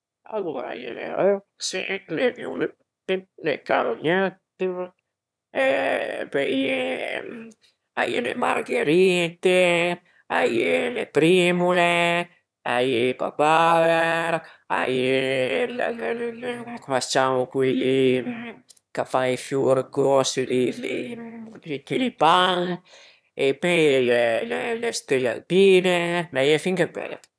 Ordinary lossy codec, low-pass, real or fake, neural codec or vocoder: none; none; fake; autoencoder, 22.05 kHz, a latent of 192 numbers a frame, VITS, trained on one speaker